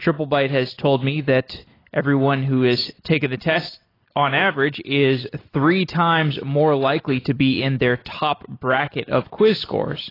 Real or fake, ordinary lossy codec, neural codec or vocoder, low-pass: real; AAC, 24 kbps; none; 5.4 kHz